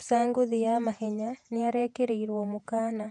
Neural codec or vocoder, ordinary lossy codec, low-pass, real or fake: vocoder, 48 kHz, 128 mel bands, Vocos; MP3, 64 kbps; 10.8 kHz; fake